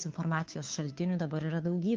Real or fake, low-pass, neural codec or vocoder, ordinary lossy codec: fake; 7.2 kHz; codec, 16 kHz, 2 kbps, FunCodec, trained on Chinese and English, 25 frames a second; Opus, 24 kbps